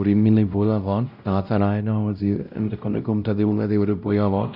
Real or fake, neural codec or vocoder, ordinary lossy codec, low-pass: fake; codec, 16 kHz, 0.5 kbps, X-Codec, WavLM features, trained on Multilingual LibriSpeech; none; 5.4 kHz